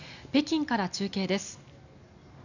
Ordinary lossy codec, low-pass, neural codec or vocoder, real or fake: none; 7.2 kHz; none; real